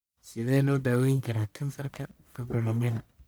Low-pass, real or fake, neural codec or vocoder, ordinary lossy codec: none; fake; codec, 44.1 kHz, 1.7 kbps, Pupu-Codec; none